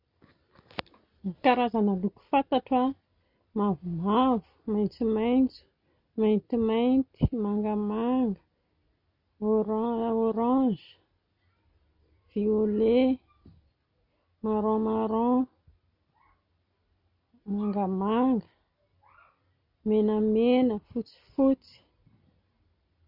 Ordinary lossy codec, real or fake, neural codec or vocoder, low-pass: none; real; none; 5.4 kHz